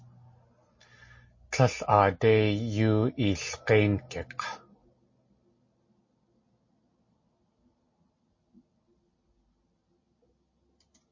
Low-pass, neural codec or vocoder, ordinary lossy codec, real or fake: 7.2 kHz; none; MP3, 32 kbps; real